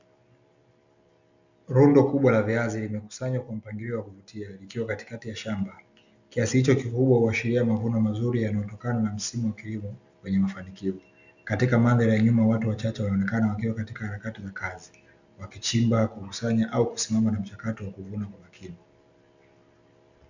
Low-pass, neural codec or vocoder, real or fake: 7.2 kHz; none; real